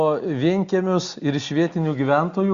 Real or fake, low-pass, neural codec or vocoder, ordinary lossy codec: real; 7.2 kHz; none; Opus, 64 kbps